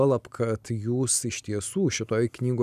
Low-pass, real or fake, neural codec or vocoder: 14.4 kHz; real; none